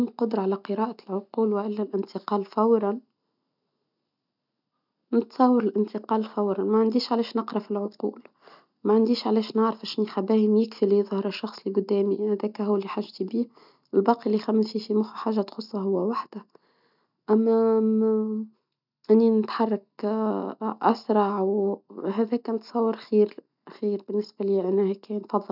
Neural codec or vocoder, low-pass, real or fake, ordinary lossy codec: none; 5.4 kHz; real; none